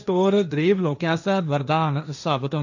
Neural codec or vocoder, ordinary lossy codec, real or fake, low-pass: codec, 16 kHz, 1.1 kbps, Voila-Tokenizer; none; fake; 7.2 kHz